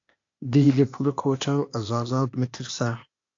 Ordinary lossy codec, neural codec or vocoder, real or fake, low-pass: AAC, 48 kbps; codec, 16 kHz, 0.8 kbps, ZipCodec; fake; 7.2 kHz